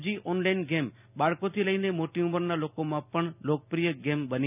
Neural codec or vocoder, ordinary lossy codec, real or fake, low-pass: none; none; real; 3.6 kHz